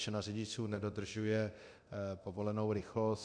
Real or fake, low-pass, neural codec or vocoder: fake; 9.9 kHz; codec, 24 kHz, 0.9 kbps, DualCodec